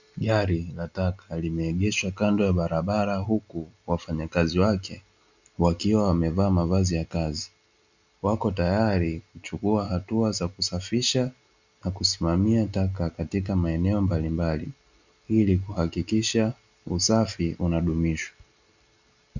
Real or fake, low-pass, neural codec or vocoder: real; 7.2 kHz; none